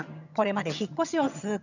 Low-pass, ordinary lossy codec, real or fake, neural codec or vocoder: 7.2 kHz; none; fake; vocoder, 22.05 kHz, 80 mel bands, HiFi-GAN